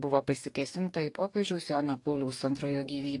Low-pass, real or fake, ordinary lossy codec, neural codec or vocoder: 10.8 kHz; fake; AAC, 48 kbps; codec, 44.1 kHz, 2.6 kbps, DAC